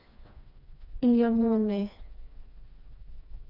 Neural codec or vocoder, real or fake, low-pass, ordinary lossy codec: codec, 16 kHz, 2 kbps, FreqCodec, smaller model; fake; 5.4 kHz; none